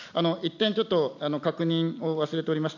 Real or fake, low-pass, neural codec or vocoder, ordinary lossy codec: real; 7.2 kHz; none; none